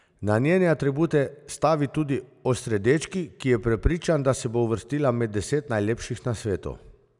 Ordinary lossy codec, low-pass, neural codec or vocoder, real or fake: none; 10.8 kHz; none; real